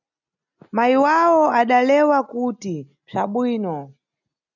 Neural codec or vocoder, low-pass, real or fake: none; 7.2 kHz; real